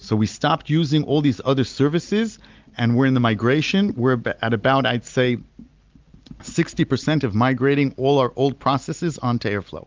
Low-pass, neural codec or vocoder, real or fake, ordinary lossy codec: 7.2 kHz; none; real; Opus, 24 kbps